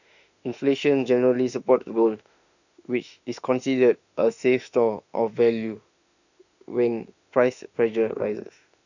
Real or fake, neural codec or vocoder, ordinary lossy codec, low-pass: fake; autoencoder, 48 kHz, 32 numbers a frame, DAC-VAE, trained on Japanese speech; none; 7.2 kHz